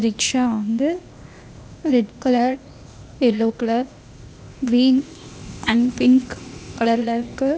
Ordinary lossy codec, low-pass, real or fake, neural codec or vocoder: none; none; fake; codec, 16 kHz, 0.8 kbps, ZipCodec